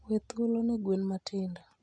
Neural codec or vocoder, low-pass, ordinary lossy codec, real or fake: none; 9.9 kHz; none; real